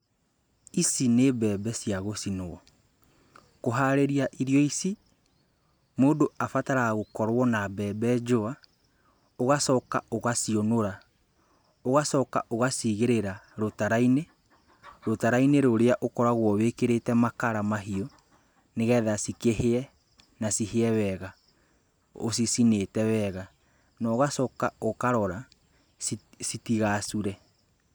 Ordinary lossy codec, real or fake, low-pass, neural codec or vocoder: none; real; none; none